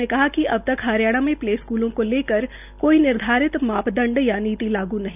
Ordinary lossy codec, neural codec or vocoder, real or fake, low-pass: none; none; real; 3.6 kHz